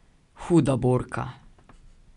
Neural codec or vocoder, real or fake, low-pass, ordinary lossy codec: none; real; 10.8 kHz; none